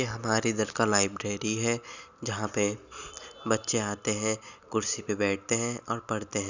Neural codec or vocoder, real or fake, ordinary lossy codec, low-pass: none; real; none; 7.2 kHz